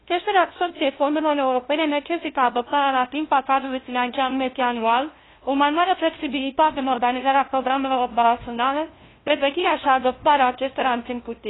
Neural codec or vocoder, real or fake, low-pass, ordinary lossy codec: codec, 16 kHz, 0.5 kbps, FunCodec, trained on LibriTTS, 25 frames a second; fake; 7.2 kHz; AAC, 16 kbps